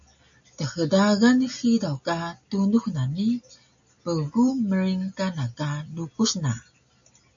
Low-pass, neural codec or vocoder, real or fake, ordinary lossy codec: 7.2 kHz; none; real; AAC, 64 kbps